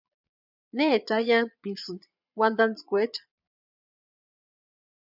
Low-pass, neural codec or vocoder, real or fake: 5.4 kHz; vocoder, 22.05 kHz, 80 mel bands, Vocos; fake